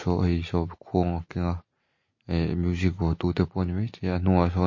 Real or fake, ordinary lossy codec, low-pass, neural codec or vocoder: real; MP3, 32 kbps; 7.2 kHz; none